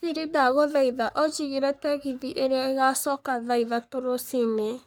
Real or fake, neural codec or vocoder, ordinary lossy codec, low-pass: fake; codec, 44.1 kHz, 3.4 kbps, Pupu-Codec; none; none